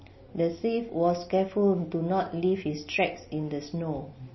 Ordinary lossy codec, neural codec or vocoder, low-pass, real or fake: MP3, 24 kbps; none; 7.2 kHz; real